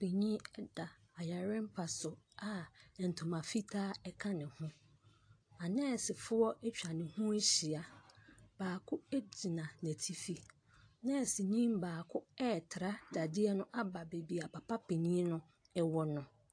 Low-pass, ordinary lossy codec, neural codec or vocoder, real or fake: 9.9 kHz; AAC, 48 kbps; none; real